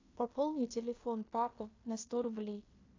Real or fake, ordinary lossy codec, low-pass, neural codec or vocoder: fake; AAC, 32 kbps; 7.2 kHz; codec, 16 kHz in and 24 kHz out, 0.9 kbps, LongCat-Audio-Codec, fine tuned four codebook decoder